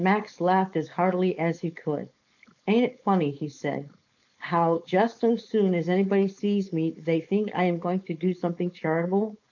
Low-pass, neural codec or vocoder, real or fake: 7.2 kHz; codec, 16 kHz, 4.8 kbps, FACodec; fake